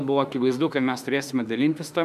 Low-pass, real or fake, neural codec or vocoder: 14.4 kHz; fake; autoencoder, 48 kHz, 32 numbers a frame, DAC-VAE, trained on Japanese speech